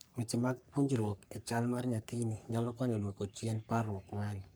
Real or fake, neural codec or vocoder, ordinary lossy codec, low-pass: fake; codec, 44.1 kHz, 3.4 kbps, Pupu-Codec; none; none